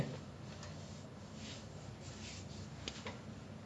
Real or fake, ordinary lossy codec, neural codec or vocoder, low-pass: real; none; none; none